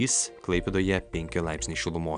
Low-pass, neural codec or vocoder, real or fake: 9.9 kHz; none; real